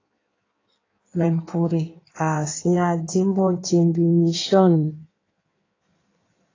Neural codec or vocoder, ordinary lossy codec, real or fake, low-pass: codec, 16 kHz in and 24 kHz out, 1.1 kbps, FireRedTTS-2 codec; AAC, 32 kbps; fake; 7.2 kHz